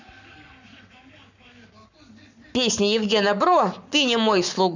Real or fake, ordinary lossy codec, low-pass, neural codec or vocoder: fake; none; 7.2 kHz; codec, 44.1 kHz, 7.8 kbps, Pupu-Codec